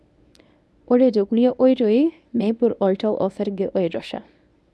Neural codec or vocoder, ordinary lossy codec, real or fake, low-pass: codec, 24 kHz, 0.9 kbps, WavTokenizer, medium speech release version 1; none; fake; none